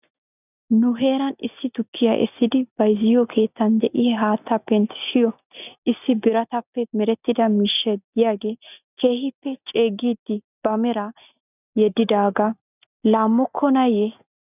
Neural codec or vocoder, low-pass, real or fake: none; 3.6 kHz; real